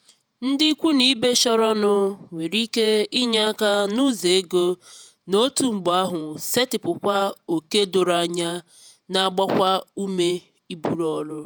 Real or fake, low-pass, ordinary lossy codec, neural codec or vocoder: fake; none; none; vocoder, 48 kHz, 128 mel bands, Vocos